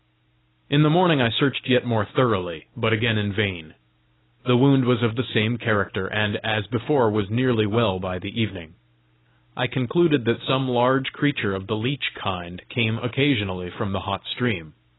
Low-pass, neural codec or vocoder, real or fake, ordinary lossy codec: 7.2 kHz; none; real; AAC, 16 kbps